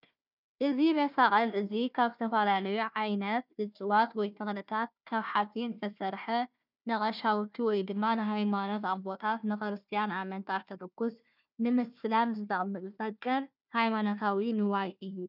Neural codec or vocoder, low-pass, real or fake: codec, 16 kHz, 1 kbps, FunCodec, trained on Chinese and English, 50 frames a second; 5.4 kHz; fake